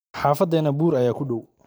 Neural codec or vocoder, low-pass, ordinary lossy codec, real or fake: vocoder, 44.1 kHz, 128 mel bands every 512 samples, BigVGAN v2; none; none; fake